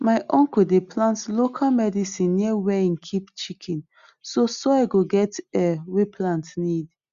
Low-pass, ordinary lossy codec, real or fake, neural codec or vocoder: 7.2 kHz; Opus, 64 kbps; real; none